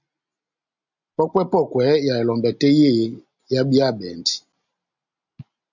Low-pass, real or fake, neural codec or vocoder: 7.2 kHz; real; none